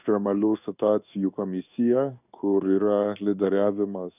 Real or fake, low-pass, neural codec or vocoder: fake; 3.6 kHz; codec, 16 kHz in and 24 kHz out, 1 kbps, XY-Tokenizer